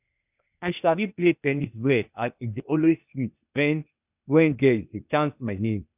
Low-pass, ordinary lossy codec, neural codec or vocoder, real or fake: 3.6 kHz; none; codec, 16 kHz, 0.8 kbps, ZipCodec; fake